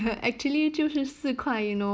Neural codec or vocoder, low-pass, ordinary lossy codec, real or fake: none; none; none; real